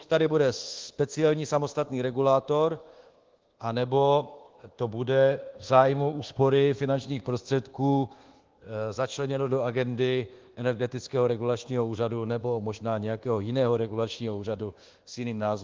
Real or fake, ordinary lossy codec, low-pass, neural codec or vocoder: fake; Opus, 16 kbps; 7.2 kHz; codec, 24 kHz, 1.2 kbps, DualCodec